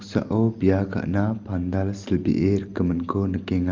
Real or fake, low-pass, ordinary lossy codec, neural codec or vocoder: real; 7.2 kHz; Opus, 24 kbps; none